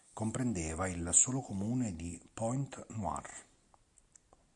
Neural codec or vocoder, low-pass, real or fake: none; 10.8 kHz; real